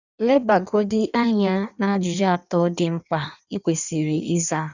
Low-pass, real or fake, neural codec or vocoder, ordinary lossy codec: 7.2 kHz; fake; codec, 16 kHz in and 24 kHz out, 1.1 kbps, FireRedTTS-2 codec; none